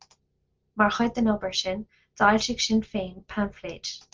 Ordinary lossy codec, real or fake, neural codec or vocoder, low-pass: Opus, 24 kbps; real; none; 7.2 kHz